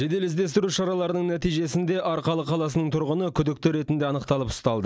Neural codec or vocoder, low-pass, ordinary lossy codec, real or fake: none; none; none; real